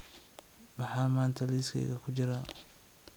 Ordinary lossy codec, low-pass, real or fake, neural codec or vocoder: none; none; real; none